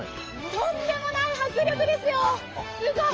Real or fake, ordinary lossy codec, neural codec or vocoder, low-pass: fake; Opus, 24 kbps; codec, 44.1 kHz, 7.8 kbps, DAC; 7.2 kHz